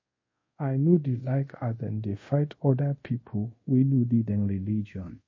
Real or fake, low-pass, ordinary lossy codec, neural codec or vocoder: fake; 7.2 kHz; MP3, 32 kbps; codec, 24 kHz, 0.5 kbps, DualCodec